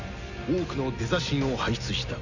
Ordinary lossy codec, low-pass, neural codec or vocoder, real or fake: none; 7.2 kHz; none; real